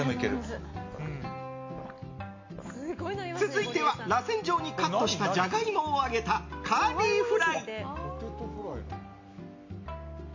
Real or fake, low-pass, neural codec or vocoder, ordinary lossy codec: real; 7.2 kHz; none; MP3, 48 kbps